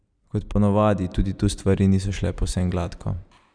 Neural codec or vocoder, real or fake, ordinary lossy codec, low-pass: vocoder, 44.1 kHz, 128 mel bands every 256 samples, BigVGAN v2; fake; none; 9.9 kHz